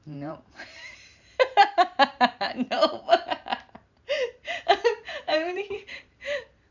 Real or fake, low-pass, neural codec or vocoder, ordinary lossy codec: fake; 7.2 kHz; vocoder, 44.1 kHz, 128 mel bands every 512 samples, BigVGAN v2; none